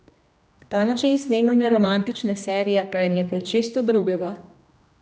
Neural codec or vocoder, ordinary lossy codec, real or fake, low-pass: codec, 16 kHz, 1 kbps, X-Codec, HuBERT features, trained on general audio; none; fake; none